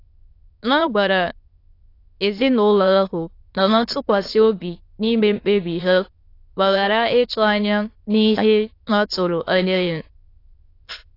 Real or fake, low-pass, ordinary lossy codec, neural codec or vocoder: fake; 5.4 kHz; AAC, 32 kbps; autoencoder, 22.05 kHz, a latent of 192 numbers a frame, VITS, trained on many speakers